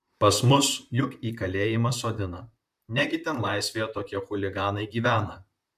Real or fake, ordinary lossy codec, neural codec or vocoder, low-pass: fake; MP3, 96 kbps; vocoder, 44.1 kHz, 128 mel bands, Pupu-Vocoder; 14.4 kHz